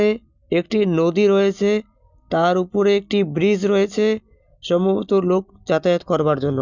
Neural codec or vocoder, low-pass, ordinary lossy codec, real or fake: none; 7.2 kHz; none; real